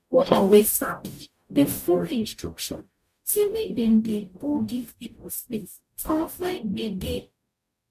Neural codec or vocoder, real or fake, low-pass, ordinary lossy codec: codec, 44.1 kHz, 0.9 kbps, DAC; fake; 14.4 kHz; MP3, 96 kbps